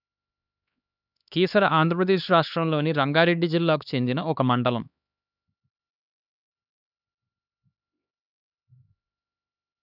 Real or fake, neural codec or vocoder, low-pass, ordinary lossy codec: fake; codec, 16 kHz, 2 kbps, X-Codec, HuBERT features, trained on LibriSpeech; 5.4 kHz; none